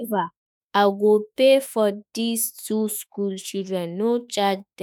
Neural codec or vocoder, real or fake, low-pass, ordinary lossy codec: autoencoder, 48 kHz, 32 numbers a frame, DAC-VAE, trained on Japanese speech; fake; none; none